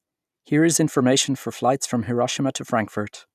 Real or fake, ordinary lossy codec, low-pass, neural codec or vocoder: real; none; 14.4 kHz; none